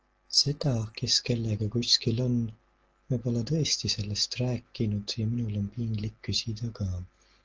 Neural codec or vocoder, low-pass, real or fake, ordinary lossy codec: none; 7.2 kHz; real; Opus, 16 kbps